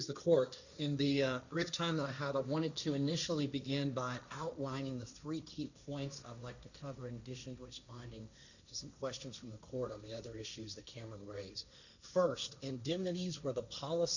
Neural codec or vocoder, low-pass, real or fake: codec, 16 kHz, 1.1 kbps, Voila-Tokenizer; 7.2 kHz; fake